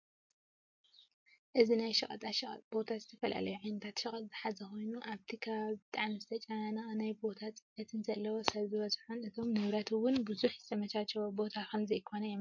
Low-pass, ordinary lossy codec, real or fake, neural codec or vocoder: 7.2 kHz; MP3, 48 kbps; real; none